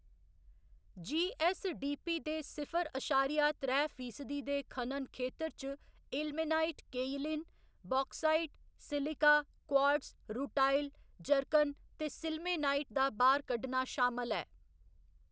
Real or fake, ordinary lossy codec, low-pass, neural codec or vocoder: real; none; none; none